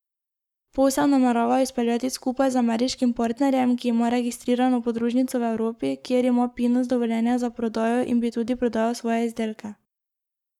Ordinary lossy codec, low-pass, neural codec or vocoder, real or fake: none; 19.8 kHz; codec, 44.1 kHz, 7.8 kbps, Pupu-Codec; fake